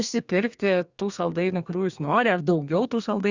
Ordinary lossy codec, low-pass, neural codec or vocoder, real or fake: Opus, 64 kbps; 7.2 kHz; codec, 32 kHz, 1.9 kbps, SNAC; fake